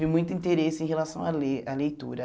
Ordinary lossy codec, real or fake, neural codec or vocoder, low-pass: none; real; none; none